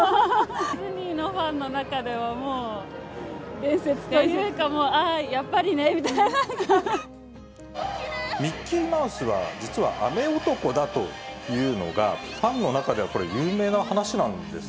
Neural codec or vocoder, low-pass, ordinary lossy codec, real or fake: none; none; none; real